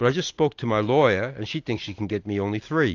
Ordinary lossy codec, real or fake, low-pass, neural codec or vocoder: AAC, 48 kbps; real; 7.2 kHz; none